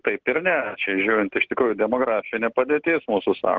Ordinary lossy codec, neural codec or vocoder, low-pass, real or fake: Opus, 16 kbps; none; 7.2 kHz; real